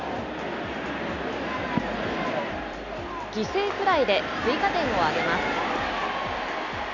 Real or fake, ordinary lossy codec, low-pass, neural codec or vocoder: real; none; 7.2 kHz; none